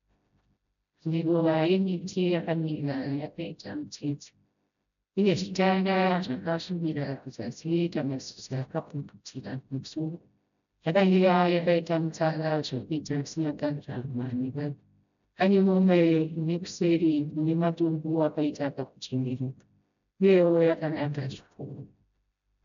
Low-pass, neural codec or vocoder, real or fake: 7.2 kHz; codec, 16 kHz, 0.5 kbps, FreqCodec, smaller model; fake